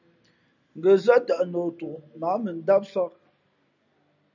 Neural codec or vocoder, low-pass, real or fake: none; 7.2 kHz; real